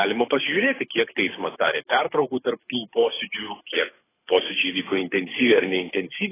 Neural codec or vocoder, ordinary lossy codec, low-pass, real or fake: codec, 24 kHz, 6 kbps, HILCodec; AAC, 16 kbps; 3.6 kHz; fake